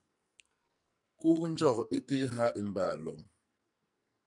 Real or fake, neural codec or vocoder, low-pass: fake; codec, 44.1 kHz, 2.6 kbps, SNAC; 10.8 kHz